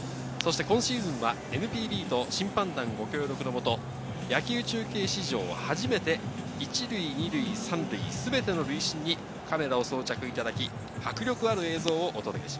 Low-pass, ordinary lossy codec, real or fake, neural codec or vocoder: none; none; real; none